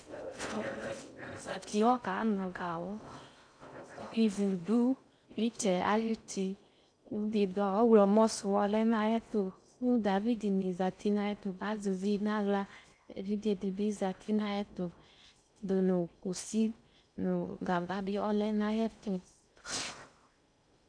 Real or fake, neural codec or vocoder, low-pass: fake; codec, 16 kHz in and 24 kHz out, 0.6 kbps, FocalCodec, streaming, 4096 codes; 9.9 kHz